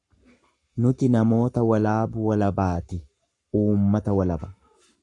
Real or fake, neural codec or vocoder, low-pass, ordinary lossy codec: fake; codec, 44.1 kHz, 7.8 kbps, Pupu-Codec; 10.8 kHz; Opus, 64 kbps